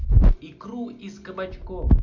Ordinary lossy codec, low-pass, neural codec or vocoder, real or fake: MP3, 64 kbps; 7.2 kHz; none; real